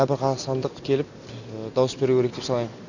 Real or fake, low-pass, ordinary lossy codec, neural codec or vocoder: real; 7.2 kHz; none; none